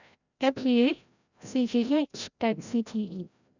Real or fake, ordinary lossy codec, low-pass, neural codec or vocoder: fake; none; 7.2 kHz; codec, 16 kHz, 0.5 kbps, FreqCodec, larger model